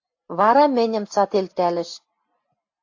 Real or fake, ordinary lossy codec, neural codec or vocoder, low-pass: real; AAC, 48 kbps; none; 7.2 kHz